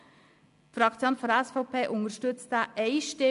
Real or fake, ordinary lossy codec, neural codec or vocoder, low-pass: real; MP3, 96 kbps; none; 10.8 kHz